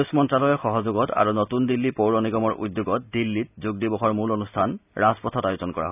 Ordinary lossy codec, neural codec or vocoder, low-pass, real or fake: none; none; 3.6 kHz; real